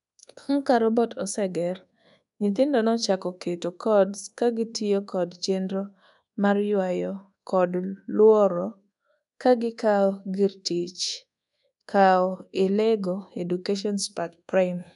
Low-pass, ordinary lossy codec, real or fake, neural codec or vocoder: 10.8 kHz; none; fake; codec, 24 kHz, 1.2 kbps, DualCodec